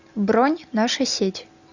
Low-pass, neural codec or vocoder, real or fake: 7.2 kHz; none; real